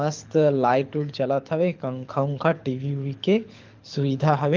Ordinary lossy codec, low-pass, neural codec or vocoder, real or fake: Opus, 32 kbps; 7.2 kHz; codec, 24 kHz, 6 kbps, HILCodec; fake